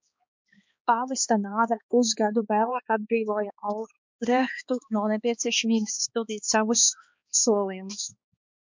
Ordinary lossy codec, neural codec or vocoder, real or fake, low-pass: MP3, 64 kbps; codec, 16 kHz, 2 kbps, X-Codec, HuBERT features, trained on balanced general audio; fake; 7.2 kHz